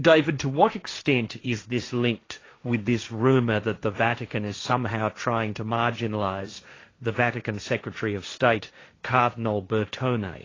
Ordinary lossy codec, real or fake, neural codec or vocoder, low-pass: AAC, 32 kbps; fake; codec, 16 kHz, 1.1 kbps, Voila-Tokenizer; 7.2 kHz